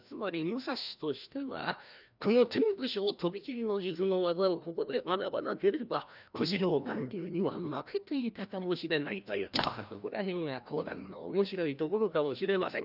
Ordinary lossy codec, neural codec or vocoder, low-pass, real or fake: none; codec, 16 kHz, 1 kbps, FreqCodec, larger model; 5.4 kHz; fake